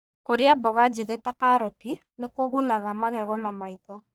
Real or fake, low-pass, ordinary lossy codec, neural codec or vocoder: fake; none; none; codec, 44.1 kHz, 1.7 kbps, Pupu-Codec